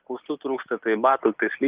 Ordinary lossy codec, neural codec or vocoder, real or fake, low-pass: Opus, 32 kbps; none; real; 3.6 kHz